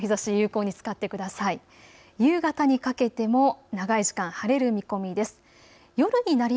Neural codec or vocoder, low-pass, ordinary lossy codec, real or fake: none; none; none; real